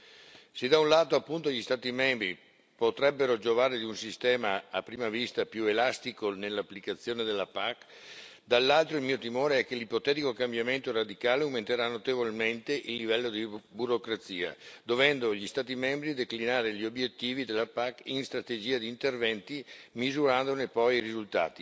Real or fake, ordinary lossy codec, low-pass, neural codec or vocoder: real; none; none; none